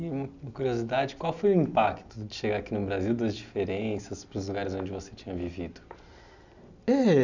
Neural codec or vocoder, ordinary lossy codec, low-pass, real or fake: none; none; 7.2 kHz; real